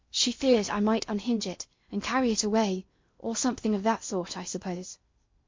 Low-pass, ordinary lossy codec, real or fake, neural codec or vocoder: 7.2 kHz; MP3, 48 kbps; fake; codec, 16 kHz in and 24 kHz out, 0.8 kbps, FocalCodec, streaming, 65536 codes